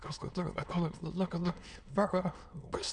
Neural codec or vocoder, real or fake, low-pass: autoencoder, 22.05 kHz, a latent of 192 numbers a frame, VITS, trained on many speakers; fake; 9.9 kHz